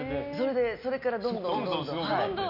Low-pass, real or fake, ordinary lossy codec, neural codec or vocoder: 5.4 kHz; real; none; none